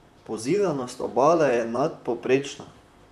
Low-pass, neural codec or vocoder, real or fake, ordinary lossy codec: 14.4 kHz; vocoder, 44.1 kHz, 128 mel bands, Pupu-Vocoder; fake; none